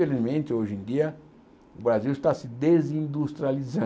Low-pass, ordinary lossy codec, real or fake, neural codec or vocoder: none; none; real; none